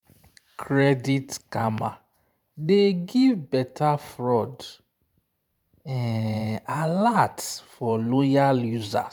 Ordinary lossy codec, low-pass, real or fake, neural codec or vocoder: none; 19.8 kHz; fake; vocoder, 44.1 kHz, 128 mel bands every 512 samples, BigVGAN v2